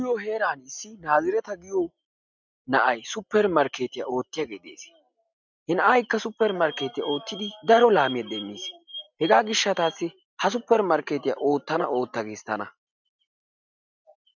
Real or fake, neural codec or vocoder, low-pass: real; none; 7.2 kHz